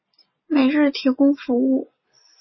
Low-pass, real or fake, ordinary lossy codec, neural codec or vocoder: 7.2 kHz; real; MP3, 24 kbps; none